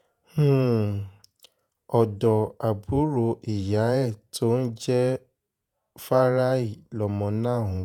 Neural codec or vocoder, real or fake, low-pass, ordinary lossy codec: vocoder, 48 kHz, 128 mel bands, Vocos; fake; 19.8 kHz; none